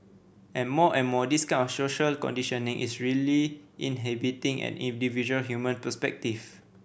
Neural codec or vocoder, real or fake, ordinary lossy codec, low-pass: none; real; none; none